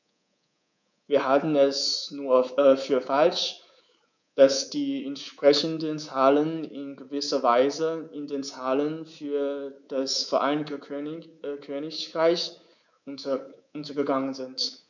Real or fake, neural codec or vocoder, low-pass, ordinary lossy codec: fake; codec, 24 kHz, 3.1 kbps, DualCodec; 7.2 kHz; none